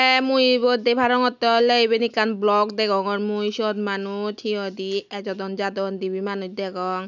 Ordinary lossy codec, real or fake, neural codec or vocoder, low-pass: none; real; none; 7.2 kHz